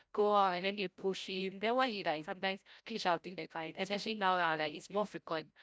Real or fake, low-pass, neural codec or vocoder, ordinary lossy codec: fake; none; codec, 16 kHz, 0.5 kbps, FreqCodec, larger model; none